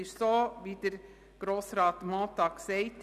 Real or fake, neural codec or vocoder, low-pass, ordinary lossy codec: real; none; 14.4 kHz; none